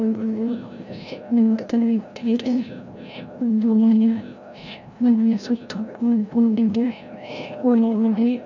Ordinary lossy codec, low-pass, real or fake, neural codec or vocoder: none; 7.2 kHz; fake; codec, 16 kHz, 0.5 kbps, FreqCodec, larger model